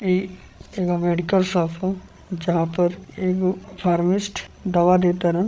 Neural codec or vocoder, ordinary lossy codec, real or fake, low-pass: codec, 16 kHz, 16 kbps, FreqCodec, larger model; none; fake; none